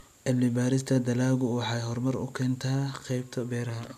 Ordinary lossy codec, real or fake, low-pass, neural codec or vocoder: none; real; 14.4 kHz; none